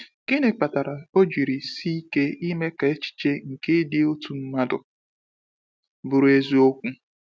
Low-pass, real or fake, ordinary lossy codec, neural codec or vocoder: none; real; none; none